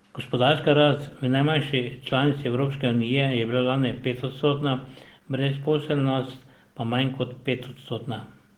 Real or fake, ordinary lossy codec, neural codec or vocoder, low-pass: real; Opus, 16 kbps; none; 19.8 kHz